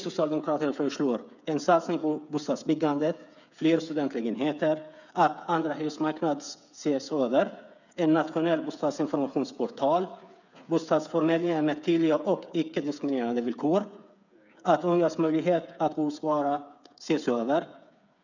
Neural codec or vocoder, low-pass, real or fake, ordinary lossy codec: codec, 16 kHz, 8 kbps, FreqCodec, smaller model; 7.2 kHz; fake; none